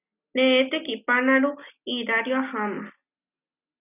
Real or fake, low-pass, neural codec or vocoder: real; 3.6 kHz; none